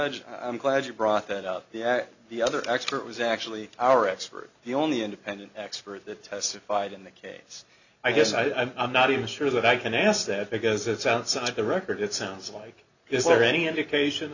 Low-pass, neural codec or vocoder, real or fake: 7.2 kHz; none; real